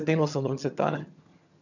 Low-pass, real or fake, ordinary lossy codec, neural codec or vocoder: 7.2 kHz; fake; none; vocoder, 22.05 kHz, 80 mel bands, HiFi-GAN